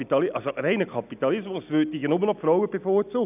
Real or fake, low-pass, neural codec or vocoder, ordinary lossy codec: real; 3.6 kHz; none; none